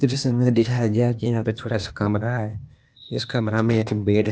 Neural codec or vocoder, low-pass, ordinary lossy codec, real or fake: codec, 16 kHz, 0.8 kbps, ZipCodec; none; none; fake